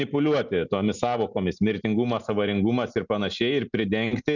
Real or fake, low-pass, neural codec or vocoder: real; 7.2 kHz; none